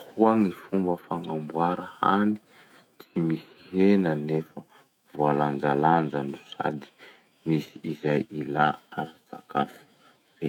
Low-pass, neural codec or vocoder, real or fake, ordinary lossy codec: 19.8 kHz; autoencoder, 48 kHz, 128 numbers a frame, DAC-VAE, trained on Japanese speech; fake; none